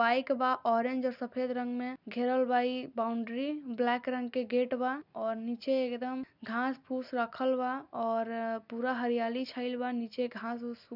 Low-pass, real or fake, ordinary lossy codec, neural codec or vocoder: 5.4 kHz; real; none; none